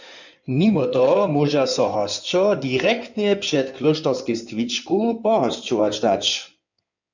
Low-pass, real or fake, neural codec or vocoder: 7.2 kHz; fake; codec, 16 kHz in and 24 kHz out, 2.2 kbps, FireRedTTS-2 codec